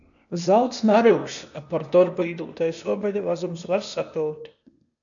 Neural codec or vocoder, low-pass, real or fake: codec, 16 kHz, 0.8 kbps, ZipCodec; 7.2 kHz; fake